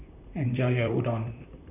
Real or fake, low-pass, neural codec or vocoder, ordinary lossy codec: fake; 3.6 kHz; vocoder, 44.1 kHz, 128 mel bands, Pupu-Vocoder; none